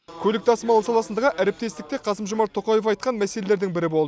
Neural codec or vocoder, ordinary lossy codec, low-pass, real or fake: none; none; none; real